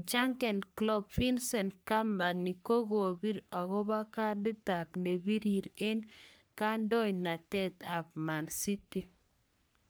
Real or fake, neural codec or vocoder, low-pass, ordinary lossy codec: fake; codec, 44.1 kHz, 3.4 kbps, Pupu-Codec; none; none